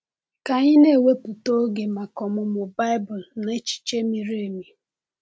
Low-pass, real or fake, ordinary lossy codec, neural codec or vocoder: none; real; none; none